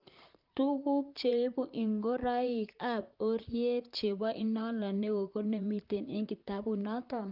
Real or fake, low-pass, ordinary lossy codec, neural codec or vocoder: fake; 5.4 kHz; Opus, 24 kbps; vocoder, 44.1 kHz, 128 mel bands, Pupu-Vocoder